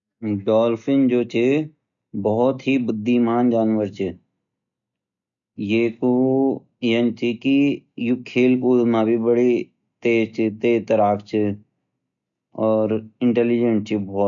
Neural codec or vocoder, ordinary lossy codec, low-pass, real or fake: none; none; 7.2 kHz; real